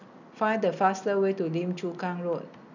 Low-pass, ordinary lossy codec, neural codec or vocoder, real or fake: 7.2 kHz; none; none; real